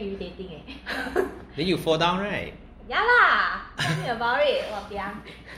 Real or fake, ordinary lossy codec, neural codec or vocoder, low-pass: real; MP3, 64 kbps; none; 19.8 kHz